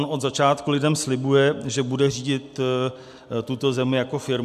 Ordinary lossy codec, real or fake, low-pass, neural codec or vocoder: MP3, 96 kbps; real; 14.4 kHz; none